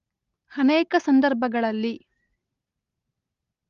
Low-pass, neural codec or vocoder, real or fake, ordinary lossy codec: 7.2 kHz; none; real; Opus, 32 kbps